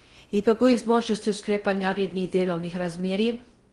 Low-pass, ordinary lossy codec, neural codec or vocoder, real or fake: 10.8 kHz; Opus, 24 kbps; codec, 16 kHz in and 24 kHz out, 0.6 kbps, FocalCodec, streaming, 4096 codes; fake